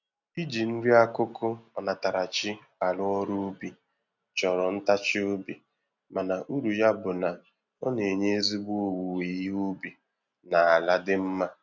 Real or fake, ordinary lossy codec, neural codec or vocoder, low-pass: real; none; none; 7.2 kHz